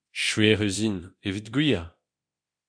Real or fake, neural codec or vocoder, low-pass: fake; codec, 24 kHz, 0.9 kbps, DualCodec; 9.9 kHz